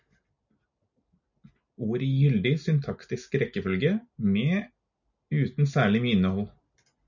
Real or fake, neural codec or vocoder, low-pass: real; none; 7.2 kHz